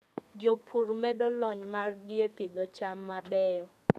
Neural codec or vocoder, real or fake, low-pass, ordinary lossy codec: codec, 32 kHz, 1.9 kbps, SNAC; fake; 14.4 kHz; none